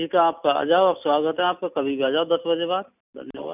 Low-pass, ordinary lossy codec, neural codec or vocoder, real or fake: 3.6 kHz; none; none; real